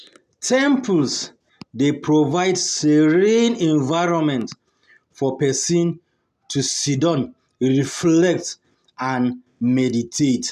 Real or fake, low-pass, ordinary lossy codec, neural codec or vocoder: real; 14.4 kHz; none; none